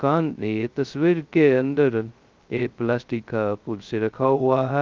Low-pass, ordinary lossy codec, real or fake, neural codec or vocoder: 7.2 kHz; Opus, 32 kbps; fake; codec, 16 kHz, 0.2 kbps, FocalCodec